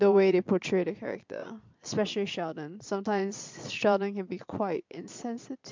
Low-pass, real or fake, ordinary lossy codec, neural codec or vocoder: 7.2 kHz; fake; MP3, 64 kbps; vocoder, 22.05 kHz, 80 mel bands, Vocos